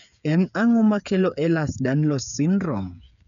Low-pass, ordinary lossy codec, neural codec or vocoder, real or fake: 7.2 kHz; none; codec, 16 kHz, 8 kbps, FreqCodec, smaller model; fake